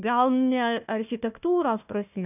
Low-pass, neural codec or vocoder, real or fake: 3.6 kHz; codec, 16 kHz, 1 kbps, FunCodec, trained on Chinese and English, 50 frames a second; fake